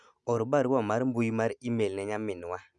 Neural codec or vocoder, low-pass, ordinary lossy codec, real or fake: none; 10.8 kHz; none; real